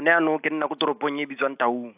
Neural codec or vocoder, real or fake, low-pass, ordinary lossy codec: none; real; 3.6 kHz; AAC, 32 kbps